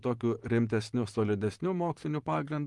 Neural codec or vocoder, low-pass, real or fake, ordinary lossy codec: vocoder, 44.1 kHz, 128 mel bands, Pupu-Vocoder; 10.8 kHz; fake; Opus, 24 kbps